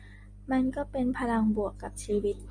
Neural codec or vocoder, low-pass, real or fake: none; 9.9 kHz; real